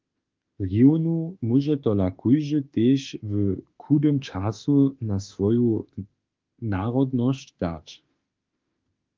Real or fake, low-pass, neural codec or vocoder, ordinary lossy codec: fake; 7.2 kHz; autoencoder, 48 kHz, 32 numbers a frame, DAC-VAE, trained on Japanese speech; Opus, 32 kbps